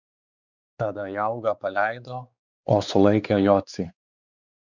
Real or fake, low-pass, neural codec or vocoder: fake; 7.2 kHz; codec, 16 kHz, 4 kbps, X-Codec, WavLM features, trained on Multilingual LibriSpeech